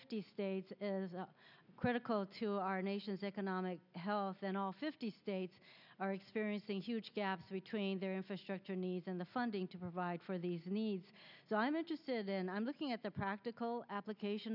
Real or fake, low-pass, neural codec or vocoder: real; 5.4 kHz; none